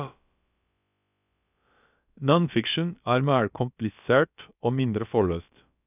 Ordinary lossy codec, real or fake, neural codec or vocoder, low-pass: none; fake; codec, 16 kHz, about 1 kbps, DyCAST, with the encoder's durations; 3.6 kHz